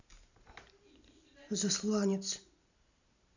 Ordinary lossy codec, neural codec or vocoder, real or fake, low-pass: none; none; real; 7.2 kHz